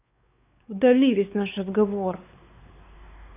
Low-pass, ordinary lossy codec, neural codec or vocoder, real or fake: 3.6 kHz; AAC, 32 kbps; codec, 16 kHz, 4 kbps, X-Codec, WavLM features, trained on Multilingual LibriSpeech; fake